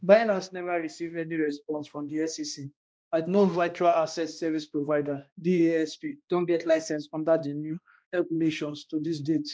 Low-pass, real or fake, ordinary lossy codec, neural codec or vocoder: none; fake; none; codec, 16 kHz, 1 kbps, X-Codec, HuBERT features, trained on balanced general audio